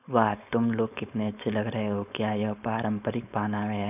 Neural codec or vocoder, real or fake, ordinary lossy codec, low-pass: codec, 16 kHz, 4.8 kbps, FACodec; fake; none; 3.6 kHz